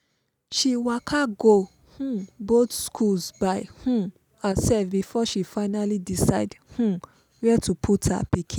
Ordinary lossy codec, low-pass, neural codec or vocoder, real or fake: none; none; none; real